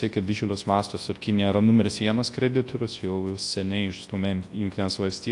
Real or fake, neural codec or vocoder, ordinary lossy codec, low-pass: fake; codec, 24 kHz, 0.9 kbps, WavTokenizer, large speech release; AAC, 48 kbps; 10.8 kHz